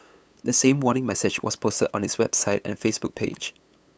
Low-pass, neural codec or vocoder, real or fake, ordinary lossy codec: none; codec, 16 kHz, 8 kbps, FunCodec, trained on LibriTTS, 25 frames a second; fake; none